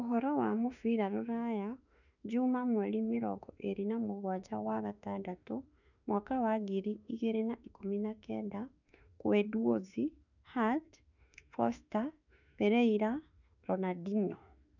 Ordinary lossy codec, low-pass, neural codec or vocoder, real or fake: none; 7.2 kHz; autoencoder, 48 kHz, 32 numbers a frame, DAC-VAE, trained on Japanese speech; fake